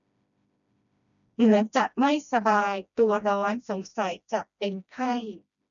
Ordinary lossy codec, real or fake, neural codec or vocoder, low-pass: none; fake; codec, 16 kHz, 1 kbps, FreqCodec, smaller model; 7.2 kHz